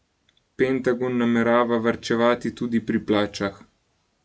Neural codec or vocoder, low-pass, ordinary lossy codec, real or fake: none; none; none; real